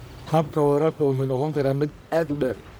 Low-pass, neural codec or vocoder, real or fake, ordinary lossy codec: none; codec, 44.1 kHz, 1.7 kbps, Pupu-Codec; fake; none